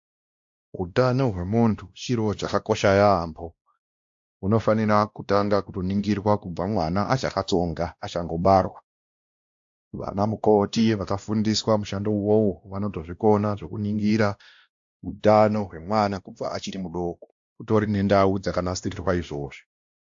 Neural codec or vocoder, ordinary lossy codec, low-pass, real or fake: codec, 16 kHz, 1 kbps, X-Codec, WavLM features, trained on Multilingual LibriSpeech; AAC, 64 kbps; 7.2 kHz; fake